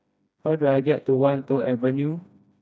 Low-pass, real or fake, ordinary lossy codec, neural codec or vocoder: none; fake; none; codec, 16 kHz, 1 kbps, FreqCodec, smaller model